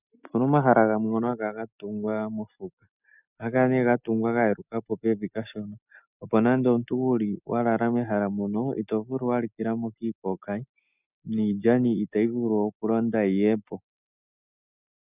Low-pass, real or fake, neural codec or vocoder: 3.6 kHz; real; none